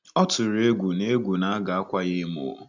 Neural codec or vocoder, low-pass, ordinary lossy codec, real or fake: none; 7.2 kHz; none; real